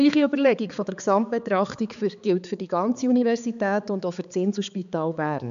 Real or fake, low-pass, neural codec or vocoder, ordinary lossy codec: fake; 7.2 kHz; codec, 16 kHz, 4 kbps, X-Codec, HuBERT features, trained on balanced general audio; MP3, 96 kbps